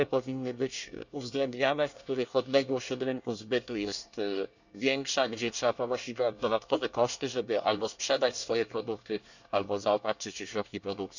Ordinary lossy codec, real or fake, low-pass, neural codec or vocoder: none; fake; 7.2 kHz; codec, 24 kHz, 1 kbps, SNAC